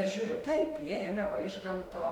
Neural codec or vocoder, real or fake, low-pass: autoencoder, 48 kHz, 32 numbers a frame, DAC-VAE, trained on Japanese speech; fake; 19.8 kHz